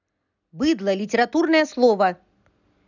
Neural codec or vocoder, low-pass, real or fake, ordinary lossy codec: none; 7.2 kHz; real; none